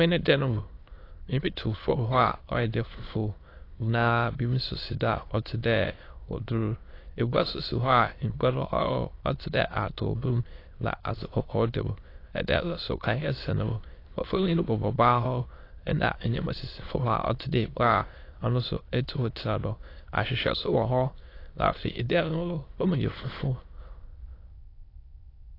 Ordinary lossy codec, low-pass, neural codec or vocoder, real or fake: AAC, 32 kbps; 5.4 kHz; autoencoder, 22.05 kHz, a latent of 192 numbers a frame, VITS, trained on many speakers; fake